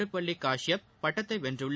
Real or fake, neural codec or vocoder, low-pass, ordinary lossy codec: real; none; none; none